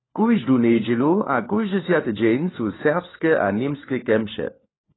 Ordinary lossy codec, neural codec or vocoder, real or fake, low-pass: AAC, 16 kbps; codec, 16 kHz, 4 kbps, FunCodec, trained on LibriTTS, 50 frames a second; fake; 7.2 kHz